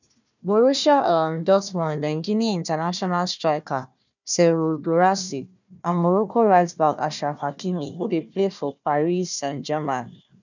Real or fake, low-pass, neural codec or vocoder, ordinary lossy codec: fake; 7.2 kHz; codec, 16 kHz, 1 kbps, FunCodec, trained on Chinese and English, 50 frames a second; none